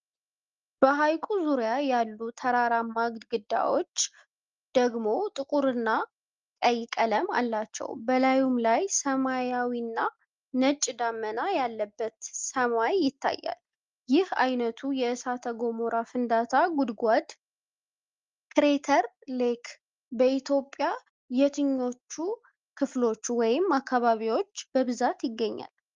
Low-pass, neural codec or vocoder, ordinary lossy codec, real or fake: 7.2 kHz; none; Opus, 32 kbps; real